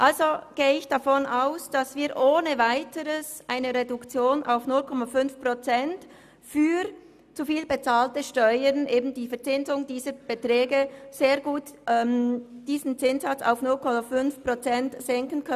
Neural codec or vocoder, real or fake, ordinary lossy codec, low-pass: none; real; none; 14.4 kHz